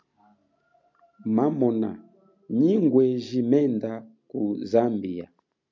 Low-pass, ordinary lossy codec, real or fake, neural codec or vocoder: 7.2 kHz; MP3, 64 kbps; real; none